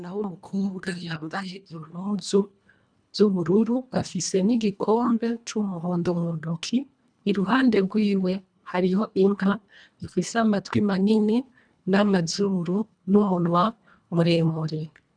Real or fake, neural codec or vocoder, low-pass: fake; codec, 24 kHz, 1.5 kbps, HILCodec; 9.9 kHz